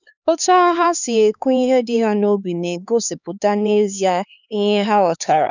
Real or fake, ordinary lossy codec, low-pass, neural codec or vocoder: fake; none; 7.2 kHz; codec, 16 kHz, 4 kbps, X-Codec, HuBERT features, trained on LibriSpeech